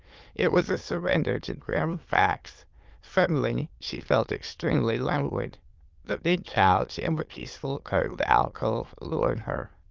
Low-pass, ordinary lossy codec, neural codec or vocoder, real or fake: 7.2 kHz; Opus, 32 kbps; autoencoder, 22.05 kHz, a latent of 192 numbers a frame, VITS, trained on many speakers; fake